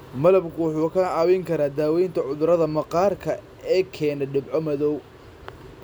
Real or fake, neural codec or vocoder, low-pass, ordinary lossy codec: real; none; none; none